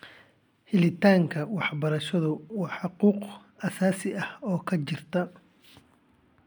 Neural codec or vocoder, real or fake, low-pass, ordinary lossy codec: none; real; 19.8 kHz; none